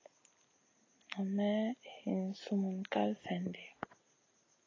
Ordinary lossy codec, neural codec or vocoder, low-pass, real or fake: AAC, 32 kbps; none; 7.2 kHz; real